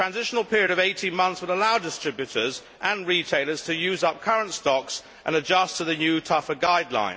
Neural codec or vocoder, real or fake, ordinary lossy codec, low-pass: none; real; none; none